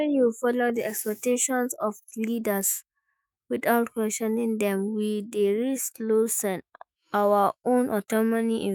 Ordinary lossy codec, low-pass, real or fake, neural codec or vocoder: none; none; fake; autoencoder, 48 kHz, 128 numbers a frame, DAC-VAE, trained on Japanese speech